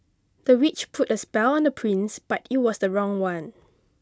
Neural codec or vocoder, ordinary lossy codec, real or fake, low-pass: none; none; real; none